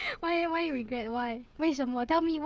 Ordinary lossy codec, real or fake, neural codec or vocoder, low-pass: none; fake; codec, 16 kHz, 8 kbps, FreqCodec, smaller model; none